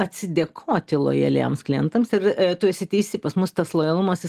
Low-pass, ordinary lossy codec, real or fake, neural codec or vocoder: 14.4 kHz; Opus, 24 kbps; real; none